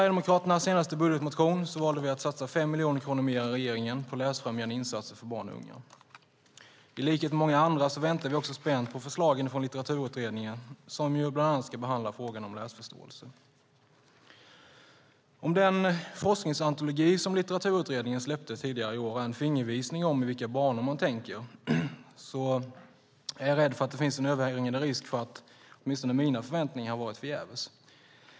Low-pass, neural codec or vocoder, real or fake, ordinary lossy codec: none; none; real; none